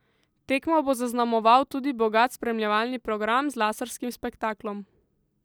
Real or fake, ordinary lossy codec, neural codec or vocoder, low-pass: real; none; none; none